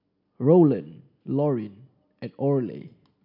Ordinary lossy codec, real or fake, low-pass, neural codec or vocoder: none; real; 5.4 kHz; none